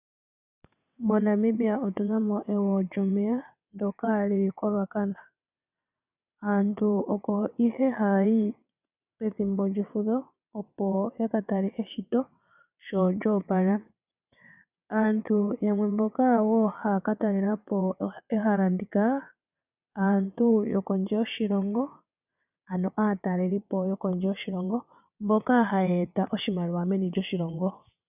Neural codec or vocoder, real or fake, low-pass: vocoder, 22.05 kHz, 80 mel bands, WaveNeXt; fake; 3.6 kHz